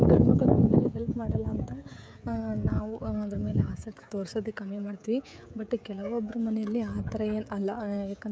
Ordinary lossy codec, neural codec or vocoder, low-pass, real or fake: none; codec, 16 kHz, 16 kbps, FreqCodec, smaller model; none; fake